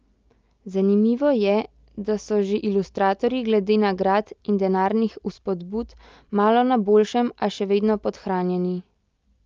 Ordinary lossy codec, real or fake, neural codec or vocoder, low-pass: Opus, 24 kbps; real; none; 7.2 kHz